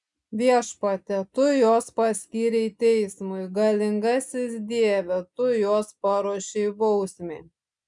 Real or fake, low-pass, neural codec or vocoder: real; 10.8 kHz; none